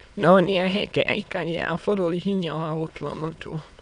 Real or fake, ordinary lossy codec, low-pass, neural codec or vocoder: fake; none; 9.9 kHz; autoencoder, 22.05 kHz, a latent of 192 numbers a frame, VITS, trained on many speakers